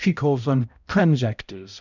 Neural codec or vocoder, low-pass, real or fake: codec, 16 kHz, 0.5 kbps, X-Codec, HuBERT features, trained on balanced general audio; 7.2 kHz; fake